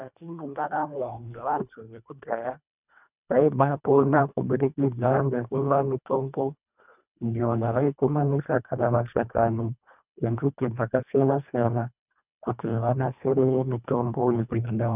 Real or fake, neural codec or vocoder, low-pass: fake; codec, 24 kHz, 1.5 kbps, HILCodec; 3.6 kHz